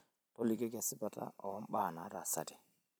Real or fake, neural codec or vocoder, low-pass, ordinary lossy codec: fake; vocoder, 44.1 kHz, 128 mel bands every 256 samples, BigVGAN v2; none; none